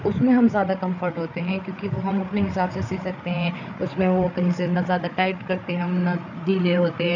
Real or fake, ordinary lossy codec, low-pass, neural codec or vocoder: fake; none; 7.2 kHz; codec, 16 kHz, 8 kbps, FreqCodec, larger model